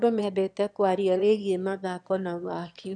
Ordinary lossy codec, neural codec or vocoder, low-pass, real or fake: none; autoencoder, 22.05 kHz, a latent of 192 numbers a frame, VITS, trained on one speaker; 9.9 kHz; fake